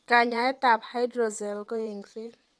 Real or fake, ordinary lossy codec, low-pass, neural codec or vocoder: fake; none; none; vocoder, 22.05 kHz, 80 mel bands, WaveNeXt